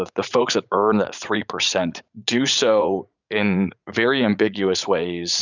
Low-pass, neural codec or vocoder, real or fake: 7.2 kHz; vocoder, 44.1 kHz, 80 mel bands, Vocos; fake